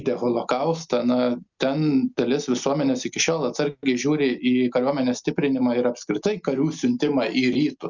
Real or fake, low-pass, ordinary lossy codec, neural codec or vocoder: real; 7.2 kHz; Opus, 64 kbps; none